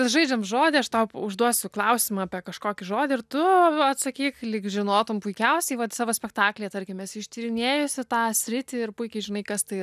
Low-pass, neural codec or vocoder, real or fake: 14.4 kHz; none; real